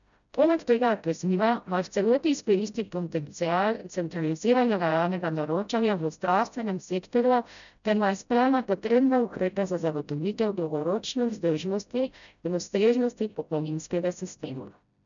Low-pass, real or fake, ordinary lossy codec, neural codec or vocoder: 7.2 kHz; fake; none; codec, 16 kHz, 0.5 kbps, FreqCodec, smaller model